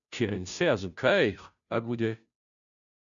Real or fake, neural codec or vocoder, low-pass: fake; codec, 16 kHz, 0.5 kbps, FunCodec, trained on Chinese and English, 25 frames a second; 7.2 kHz